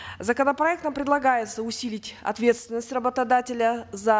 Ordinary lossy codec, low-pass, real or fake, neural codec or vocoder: none; none; real; none